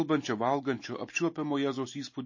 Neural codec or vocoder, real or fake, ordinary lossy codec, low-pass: none; real; MP3, 32 kbps; 7.2 kHz